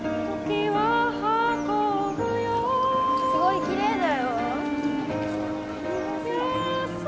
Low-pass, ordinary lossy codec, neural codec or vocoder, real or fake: none; none; none; real